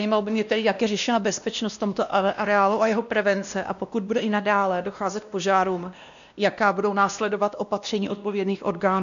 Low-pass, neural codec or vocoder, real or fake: 7.2 kHz; codec, 16 kHz, 1 kbps, X-Codec, WavLM features, trained on Multilingual LibriSpeech; fake